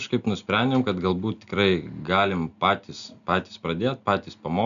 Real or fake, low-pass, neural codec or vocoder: real; 7.2 kHz; none